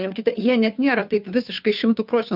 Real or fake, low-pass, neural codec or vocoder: fake; 5.4 kHz; codec, 16 kHz, 2 kbps, FunCodec, trained on Chinese and English, 25 frames a second